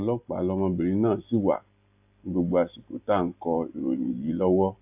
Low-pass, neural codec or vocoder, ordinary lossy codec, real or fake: 3.6 kHz; none; AAC, 32 kbps; real